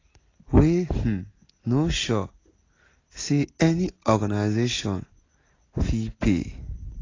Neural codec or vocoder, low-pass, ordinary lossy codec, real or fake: none; 7.2 kHz; AAC, 32 kbps; real